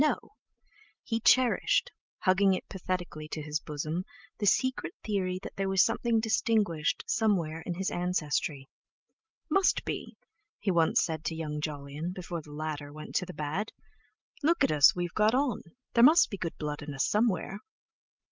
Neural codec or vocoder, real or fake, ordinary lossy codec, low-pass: none; real; Opus, 24 kbps; 7.2 kHz